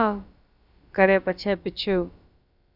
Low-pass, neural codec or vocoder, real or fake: 5.4 kHz; codec, 16 kHz, about 1 kbps, DyCAST, with the encoder's durations; fake